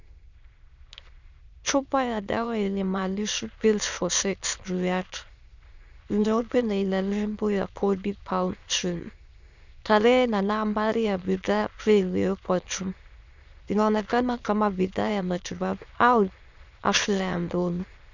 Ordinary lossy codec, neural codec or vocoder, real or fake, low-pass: Opus, 64 kbps; autoencoder, 22.05 kHz, a latent of 192 numbers a frame, VITS, trained on many speakers; fake; 7.2 kHz